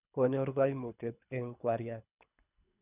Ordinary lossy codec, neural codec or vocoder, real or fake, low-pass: none; codec, 24 kHz, 3 kbps, HILCodec; fake; 3.6 kHz